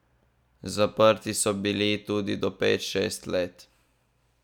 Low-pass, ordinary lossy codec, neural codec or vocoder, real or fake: 19.8 kHz; none; none; real